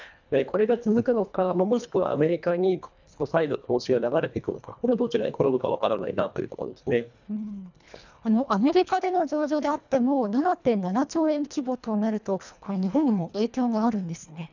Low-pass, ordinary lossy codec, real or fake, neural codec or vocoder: 7.2 kHz; none; fake; codec, 24 kHz, 1.5 kbps, HILCodec